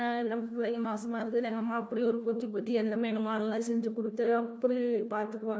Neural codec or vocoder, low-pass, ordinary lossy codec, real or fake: codec, 16 kHz, 1 kbps, FunCodec, trained on LibriTTS, 50 frames a second; none; none; fake